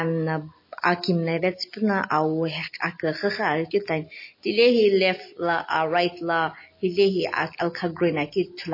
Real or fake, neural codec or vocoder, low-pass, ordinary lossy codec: real; none; 5.4 kHz; MP3, 24 kbps